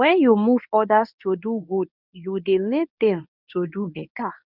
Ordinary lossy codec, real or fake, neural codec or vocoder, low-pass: none; fake; codec, 24 kHz, 0.9 kbps, WavTokenizer, medium speech release version 1; 5.4 kHz